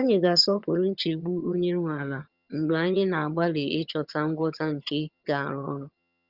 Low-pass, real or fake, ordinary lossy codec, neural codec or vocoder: 5.4 kHz; fake; Opus, 64 kbps; vocoder, 22.05 kHz, 80 mel bands, HiFi-GAN